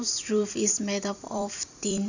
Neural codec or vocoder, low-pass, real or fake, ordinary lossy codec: vocoder, 44.1 kHz, 128 mel bands every 256 samples, BigVGAN v2; 7.2 kHz; fake; none